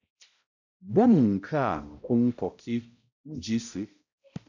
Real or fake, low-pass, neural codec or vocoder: fake; 7.2 kHz; codec, 16 kHz, 0.5 kbps, X-Codec, HuBERT features, trained on balanced general audio